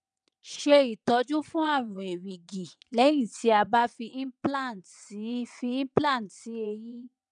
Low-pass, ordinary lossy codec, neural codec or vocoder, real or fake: 9.9 kHz; none; vocoder, 22.05 kHz, 80 mel bands, WaveNeXt; fake